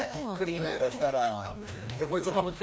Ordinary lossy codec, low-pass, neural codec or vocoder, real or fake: none; none; codec, 16 kHz, 1 kbps, FreqCodec, larger model; fake